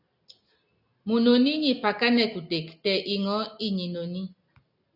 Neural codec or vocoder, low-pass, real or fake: none; 5.4 kHz; real